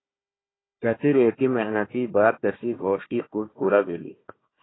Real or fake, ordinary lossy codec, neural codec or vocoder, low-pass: fake; AAC, 16 kbps; codec, 16 kHz, 1 kbps, FunCodec, trained on Chinese and English, 50 frames a second; 7.2 kHz